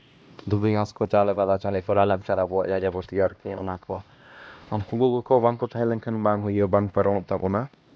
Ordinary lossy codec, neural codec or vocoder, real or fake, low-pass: none; codec, 16 kHz, 1 kbps, X-Codec, HuBERT features, trained on LibriSpeech; fake; none